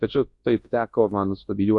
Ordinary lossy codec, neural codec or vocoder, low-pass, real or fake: AAC, 48 kbps; codec, 24 kHz, 0.9 kbps, WavTokenizer, large speech release; 10.8 kHz; fake